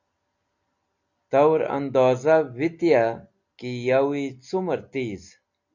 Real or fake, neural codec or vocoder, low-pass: real; none; 7.2 kHz